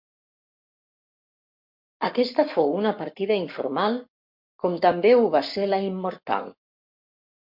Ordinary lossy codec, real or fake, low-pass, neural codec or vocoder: MP3, 48 kbps; fake; 5.4 kHz; codec, 44.1 kHz, 7.8 kbps, Pupu-Codec